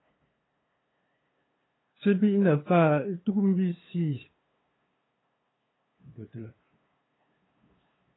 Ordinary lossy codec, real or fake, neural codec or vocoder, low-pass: AAC, 16 kbps; fake; codec, 16 kHz, 2 kbps, FunCodec, trained on LibriTTS, 25 frames a second; 7.2 kHz